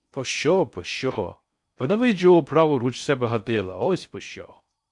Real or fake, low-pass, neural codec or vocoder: fake; 10.8 kHz; codec, 16 kHz in and 24 kHz out, 0.6 kbps, FocalCodec, streaming, 4096 codes